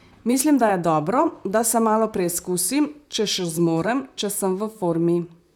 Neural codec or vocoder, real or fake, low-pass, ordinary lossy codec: vocoder, 44.1 kHz, 128 mel bands, Pupu-Vocoder; fake; none; none